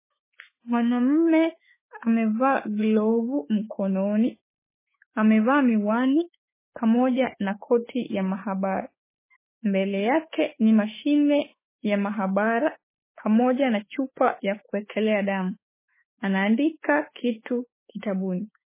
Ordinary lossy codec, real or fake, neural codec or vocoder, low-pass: MP3, 16 kbps; fake; autoencoder, 48 kHz, 32 numbers a frame, DAC-VAE, trained on Japanese speech; 3.6 kHz